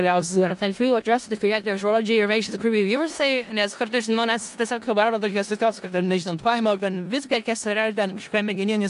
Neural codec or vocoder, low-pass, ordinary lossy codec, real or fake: codec, 16 kHz in and 24 kHz out, 0.4 kbps, LongCat-Audio-Codec, four codebook decoder; 10.8 kHz; AAC, 64 kbps; fake